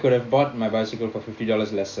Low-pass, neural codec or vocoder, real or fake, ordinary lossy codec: 7.2 kHz; none; real; AAC, 48 kbps